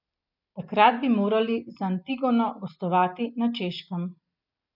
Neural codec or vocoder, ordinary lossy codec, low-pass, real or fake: none; none; 5.4 kHz; real